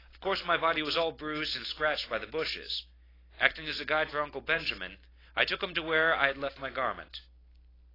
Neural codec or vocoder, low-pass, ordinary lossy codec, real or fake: none; 5.4 kHz; AAC, 24 kbps; real